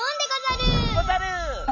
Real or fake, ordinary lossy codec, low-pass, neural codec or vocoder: real; none; 7.2 kHz; none